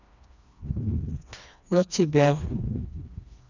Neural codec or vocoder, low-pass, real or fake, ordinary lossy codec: codec, 16 kHz, 2 kbps, FreqCodec, smaller model; 7.2 kHz; fake; none